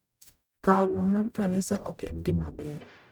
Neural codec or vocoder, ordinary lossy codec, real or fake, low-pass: codec, 44.1 kHz, 0.9 kbps, DAC; none; fake; none